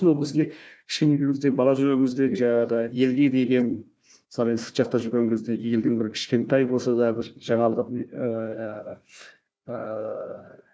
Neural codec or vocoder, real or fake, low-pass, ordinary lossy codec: codec, 16 kHz, 1 kbps, FunCodec, trained on Chinese and English, 50 frames a second; fake; none; none